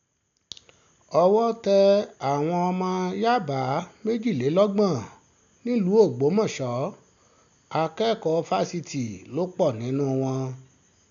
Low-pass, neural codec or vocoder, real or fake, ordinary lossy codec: 7.2 kHz; none; real; none